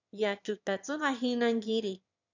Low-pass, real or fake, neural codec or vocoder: 7.2 kHz; fake; autoencoder, 22.05 kHz, a latent of 192 numbers a frame, VITS, trained on one speaker